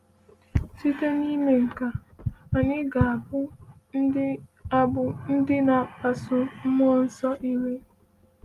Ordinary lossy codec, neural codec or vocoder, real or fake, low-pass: Opus, 32 kbps; none; real; 14.4 kHz